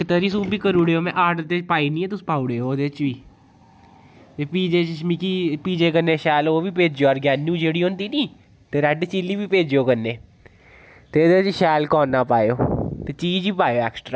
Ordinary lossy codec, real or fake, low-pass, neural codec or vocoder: none; real; none; none